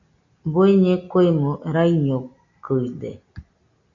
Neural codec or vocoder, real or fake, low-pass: none; real; 7.2 kHz